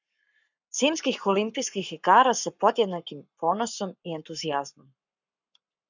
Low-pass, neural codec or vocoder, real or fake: 7.2 kHz; codec, 44.1 kHz, 7.8 kbps, Pupu-Codec; fake